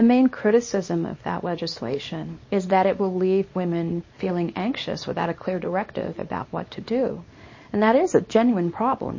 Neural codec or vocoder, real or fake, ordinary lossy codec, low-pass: codec, 24 kHz, 0.9 kbps, WavTokenizer, small release; fake; MP3, 32 kbps; 7.2 kHz